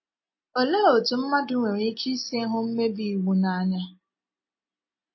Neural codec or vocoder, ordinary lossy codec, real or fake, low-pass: none; MP3, 24 kbps; real; 7.2 kHz